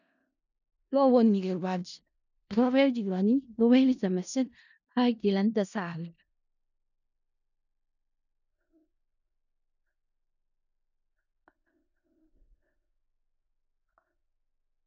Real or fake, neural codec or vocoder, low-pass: fake; codec, 16 kHz in and 24 kHz out, 0.4 kbps, LongCat-Audio-Codec, four codebook decoder; 7.2 kHz